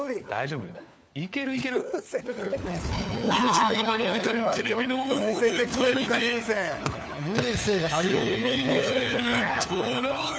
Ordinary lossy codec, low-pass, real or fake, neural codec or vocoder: none; none; fake; codec, 16 kHz, 4 kbps, FunCodec, trained on LibriTTS, 50 frames a second